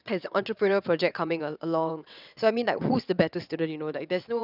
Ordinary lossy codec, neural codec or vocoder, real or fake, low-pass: none; vocoder, 22.05 kHz, 80 mel bands, WaveNeXt; fake; 5.4 kHz